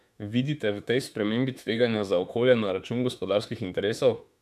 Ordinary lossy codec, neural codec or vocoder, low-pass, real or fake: none; autoencoder, 48 kHz, 32 numbers a frame, DAC-VAE, trained on Japanese speech; 14.4 kHz; fake